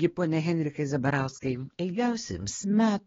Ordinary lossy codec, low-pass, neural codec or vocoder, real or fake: AAC, 24 kbps; 7.2 kHz; codec, 16 kHz, 2 kbps, X-Codec, HuBERT features, trained on balanced general audio; fake